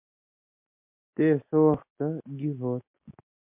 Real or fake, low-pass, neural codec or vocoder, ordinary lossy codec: real; 3.6 kHz; none; MP3, 32 kbps